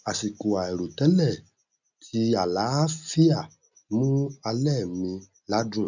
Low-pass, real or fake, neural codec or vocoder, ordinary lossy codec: 7.2 kHz; real; none; none